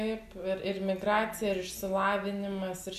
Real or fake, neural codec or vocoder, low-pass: real; none; 14.4 kHz